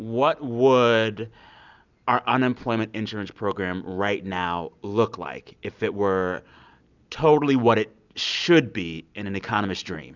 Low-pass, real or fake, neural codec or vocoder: 7.2 kHz; real; none